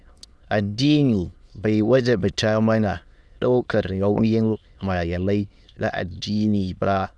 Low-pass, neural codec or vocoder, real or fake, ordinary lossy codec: none; autoencoder, 22.05 kHz, a latent of 192 numbers a frame, VITS, trained on many speakers; fake; none